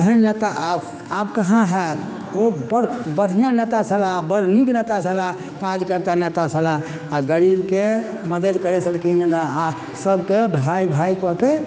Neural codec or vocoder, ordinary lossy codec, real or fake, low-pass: codec, 16 kHz, 2 kbps, X-Codec, HuBERT features, trained on general audio; none; fake; none